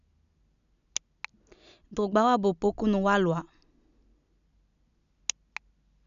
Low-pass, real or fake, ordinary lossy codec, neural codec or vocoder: 7.2 kHz; real; MP3, 96 kbps; none